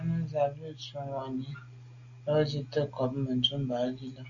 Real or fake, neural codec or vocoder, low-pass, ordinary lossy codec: real; none; 7.2 kHz; AAC, 64 kbps